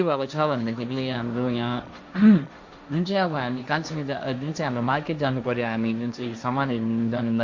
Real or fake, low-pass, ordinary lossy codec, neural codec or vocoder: fake; 7.2 kHz; MP3, 64 kbps; codec, 16 kHz, 1.1 kbps, Voila-Tokenizer